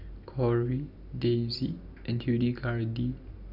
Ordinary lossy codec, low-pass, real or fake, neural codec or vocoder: none; 5.4 kHz; real; none